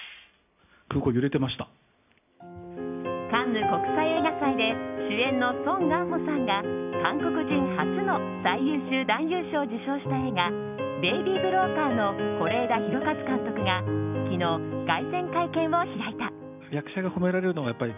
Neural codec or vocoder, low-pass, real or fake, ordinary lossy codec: none; 3.6 kHz; real; none